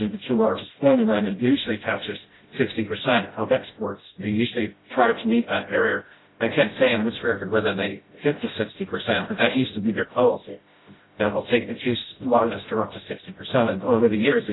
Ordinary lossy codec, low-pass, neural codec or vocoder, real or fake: AAC, 16 kbps; 7.2 kHz; codec, 16 kHz, 0.5 kbps, FreqCodec, smaller model; fake